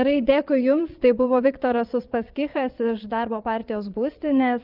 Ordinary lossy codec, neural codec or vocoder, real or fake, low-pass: Opus, 24 kbps; vocoder, 22.05 kHz, 80 mel bands, Vocos; fake; 5.4 kHz